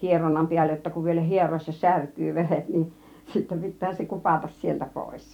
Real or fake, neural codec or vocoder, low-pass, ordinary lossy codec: fake; vocoder, 44.1 kHz, 128 mel bands every 256 samples, BigVGAN v2; 19.8 kHz; none